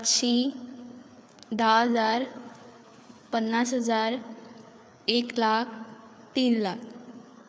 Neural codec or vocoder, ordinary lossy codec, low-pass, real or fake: codec, 16 kHz, 4 kbps, FreqCodec, larger model; none; none; fake